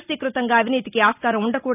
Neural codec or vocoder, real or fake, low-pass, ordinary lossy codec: none; real; 3.6 kHz; none